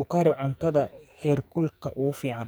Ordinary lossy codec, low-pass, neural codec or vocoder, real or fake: none; none; codec, 44.1 kHz, 2.6 kbps, SNAC; fake